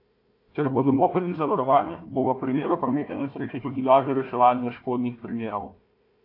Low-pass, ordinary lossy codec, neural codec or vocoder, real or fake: 5.4 kHz; AAC, 32 kbps; codec, 16 kHz, 1 kbps, FunCodec, trained on Chinese and English, 50 frames a second; fake